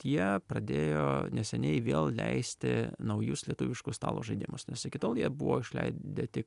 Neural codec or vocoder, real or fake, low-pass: none; real; 10.8 kHz